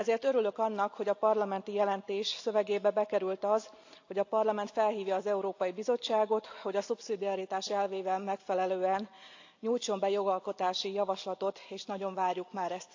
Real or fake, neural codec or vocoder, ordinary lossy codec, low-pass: real; none; AAC, 48 kbps; 7.2 kHz